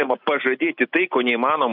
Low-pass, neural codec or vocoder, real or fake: 5.4 kHz; none; real